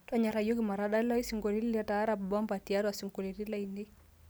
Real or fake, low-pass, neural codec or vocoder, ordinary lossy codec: real; none; none; none